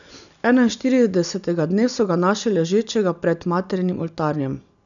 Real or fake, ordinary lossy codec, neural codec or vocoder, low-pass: real; none; none; 7.2 kHz